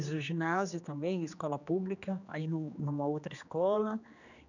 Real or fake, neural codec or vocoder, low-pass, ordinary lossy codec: fake; codec, 16 kHz, 2 kbps, X-Codec, HuBERT features, trained on general audio; 7.2 kHz; none